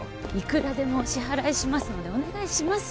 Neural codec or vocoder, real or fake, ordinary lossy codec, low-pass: none; real; none; none